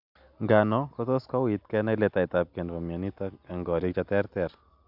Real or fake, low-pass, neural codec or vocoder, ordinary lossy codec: real; 5.4 kHz; none; AAC, 48 kbps